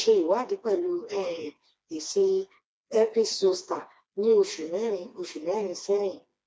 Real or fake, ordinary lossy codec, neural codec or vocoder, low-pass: fake; none; codec, 16 kHz, 2 kbps, FreqCodec, smaller model; none